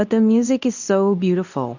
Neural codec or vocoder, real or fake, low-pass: codec, 24 kHz, 0.9 kbps, WavTokenizer, medium speech release version 2; fake; 7.2 kHz